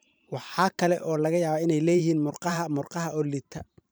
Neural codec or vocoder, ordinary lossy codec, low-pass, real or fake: vocoder, 44.1 kHz, 128 mel bands every 512 samples, BigVGAN v2; none; none; fake